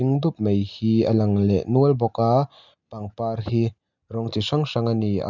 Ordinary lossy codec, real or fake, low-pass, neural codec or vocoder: none; real; 7.2 kHz; none